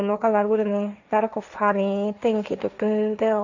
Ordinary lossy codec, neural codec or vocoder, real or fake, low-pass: none; codec, 16 kHz, 1.1 kbps, Voila-Tokenizer; fake; 7.2 kHz